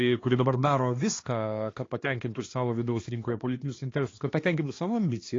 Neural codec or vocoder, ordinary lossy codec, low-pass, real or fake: codec, 16 kHz, 2 kbps, X-Codec, HuBERT features, trained on balanced general audio; AAC, 32 kbps; 7.2 kHz; fake